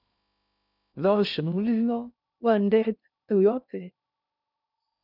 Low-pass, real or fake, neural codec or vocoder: 5.4 kHz; fake; codec, 16 kHz in and 24 kHz out, 0.6 kbps, FocalCodec, streaming, 2048 codes